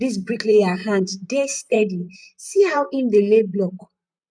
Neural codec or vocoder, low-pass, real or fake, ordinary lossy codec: vocoder, 44.1 kHz, 128 mel bands, Pupu-Vocoder; 9.9 kHz; fake; none